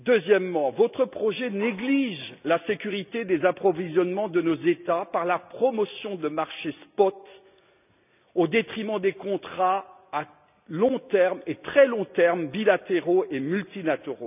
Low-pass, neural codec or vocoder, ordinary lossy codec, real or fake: 3.6 kHz; none; none; real